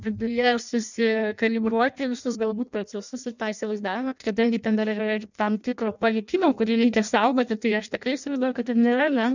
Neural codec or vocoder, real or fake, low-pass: codec, 16 kHz in and 24 kHz out, 0.6 kbps, FireRedTTS-2 codec; fake; 7.2 kHz